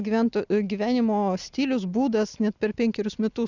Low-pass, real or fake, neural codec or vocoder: 7.2 kHz; real; none